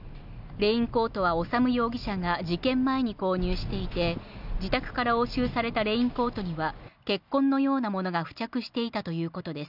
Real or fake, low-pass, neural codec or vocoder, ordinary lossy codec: real; 5.4 kHz; none; none